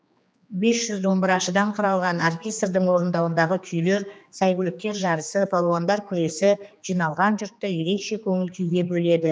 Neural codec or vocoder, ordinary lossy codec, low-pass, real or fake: codec, 16 kHz, 2 kbps, X-Codec, HuBERT features, trained on general audio; none; none; fake